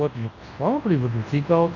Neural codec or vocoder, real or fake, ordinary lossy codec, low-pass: codec, 24 kHz, 0.9 kbps, WavTokenizer, large speech release; fake; AAC, 32 kbps; 7.2 kHz